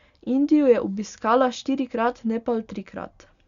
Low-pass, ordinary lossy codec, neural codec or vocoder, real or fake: 7.2 kHz; Opus, 64 kbps; none; real